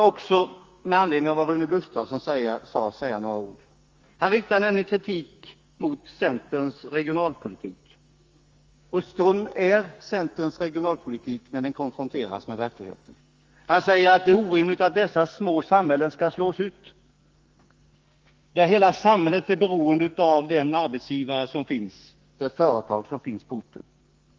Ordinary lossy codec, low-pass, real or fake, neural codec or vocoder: Opus, 32 kbps; 7.2 kHz; fake; codec, 44.1 kHz, 2.6 kbps, SNAC